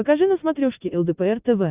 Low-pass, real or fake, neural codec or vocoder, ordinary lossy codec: 3.6 kHz; real; none; Opus, 64 kbps